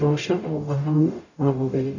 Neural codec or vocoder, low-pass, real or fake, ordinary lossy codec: codec, 44.1 kHz, 0.9 kbps, DAC; 7.2 kHz; fake; none